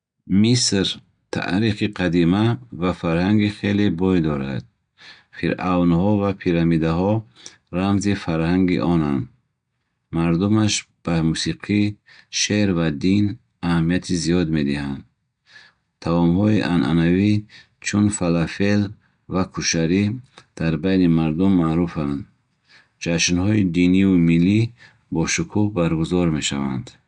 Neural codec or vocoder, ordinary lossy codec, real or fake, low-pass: none; none; real; 9.9 kHz